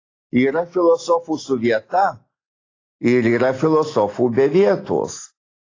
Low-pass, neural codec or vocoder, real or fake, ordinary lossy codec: 7.2 kHz; none; real; AAC, 32 kbps